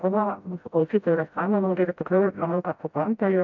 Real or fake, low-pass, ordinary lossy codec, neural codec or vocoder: fake; 7.2 kHz; AAC, 48 kbps; codec, 16 kHz, 0.5 kbps, FreqCodec, smaller model